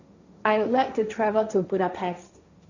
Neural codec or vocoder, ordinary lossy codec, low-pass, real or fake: codec, 16 kHz, 1.1 kbps, Voila-Tokenizer; none; 7.2 kHz; fake